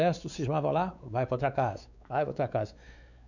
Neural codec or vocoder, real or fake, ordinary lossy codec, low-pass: codec, 16 kHz, 4 kbps, X-Codec, WavLM features, trained on Multilingual LibriSpeech; fake; none; 7.2 kHz